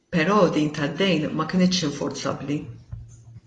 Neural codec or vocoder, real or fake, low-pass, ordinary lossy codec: none; real; 10.8 kHz; AAC, 32 kbps